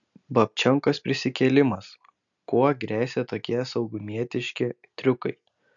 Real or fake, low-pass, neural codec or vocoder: real; 7.2 kHz; none